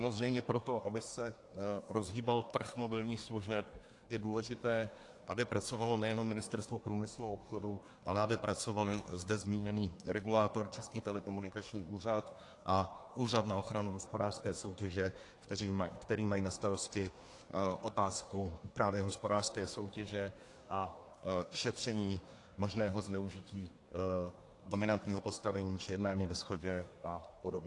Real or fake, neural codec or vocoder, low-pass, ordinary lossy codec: fake; codec, 24 kHz, 1 kbps, SNAC; 10.8 kHz; AAC, 48 kbps